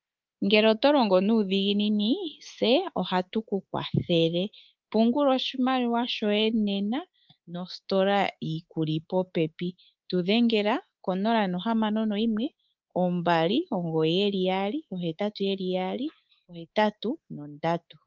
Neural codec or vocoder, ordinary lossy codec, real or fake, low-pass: codec, 24 kHz, 3.1 kbps, DualCodec; Opus, 24 kbps; fake; 7.2 kHz